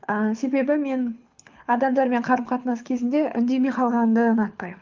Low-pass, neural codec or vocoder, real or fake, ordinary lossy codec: 7.2 kHz; codec, 24 kHz, 6 kbps, HILCodec; fake; Opus, 24 kbps